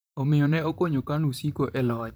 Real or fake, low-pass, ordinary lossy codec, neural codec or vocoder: fake; none; none; vocoder, 44.1 kHz, 128 mel bands, Pupu-Vocoder